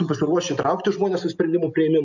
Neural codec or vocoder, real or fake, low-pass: none; real; 7.2 kHz